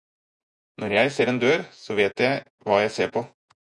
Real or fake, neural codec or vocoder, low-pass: fake; vocoder, 48 kHz, 128 mel bands, Vocos; 10.8 kHz